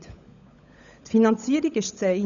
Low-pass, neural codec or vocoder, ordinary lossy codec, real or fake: 7.2 kHz; codec, 16 kHz, 16 kbps, FunCodec, trained on LibriTTS, 50 frames a second; none; fake